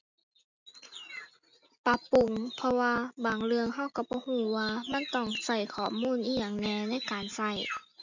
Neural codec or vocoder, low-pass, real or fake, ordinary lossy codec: none; 7.2 kHz; real; none